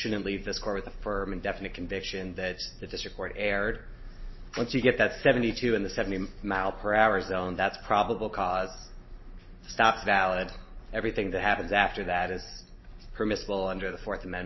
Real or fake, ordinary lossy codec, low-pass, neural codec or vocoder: real; MP3, 24 kbps; 7.2 kHz; none